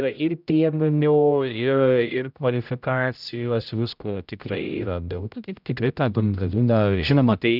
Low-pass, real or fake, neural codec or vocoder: 5.4 kHz; fake; codec, 16 kHz, 0.5 kbps, X-Codec, HuBERT features, trained on general audio